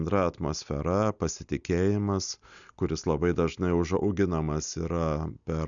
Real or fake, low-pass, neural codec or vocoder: real; 7.2 kHz; none